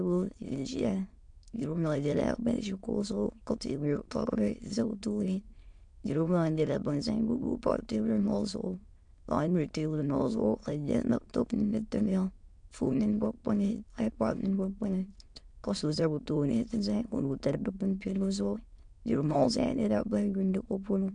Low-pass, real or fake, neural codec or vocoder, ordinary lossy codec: 9.9 kHz; fake; autoencoder, 22.05 kHz, a latent of 192 numbers a frame, VITS, trained on many speakers; MP3, 64 kbps